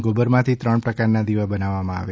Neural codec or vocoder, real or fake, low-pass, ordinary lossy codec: none; real; none; none